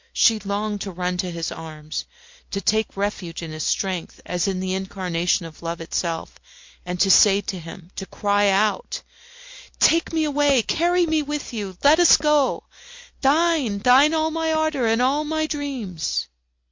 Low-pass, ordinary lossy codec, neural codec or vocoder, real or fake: 7.2 kHz; MP3, 48 kbps; none; real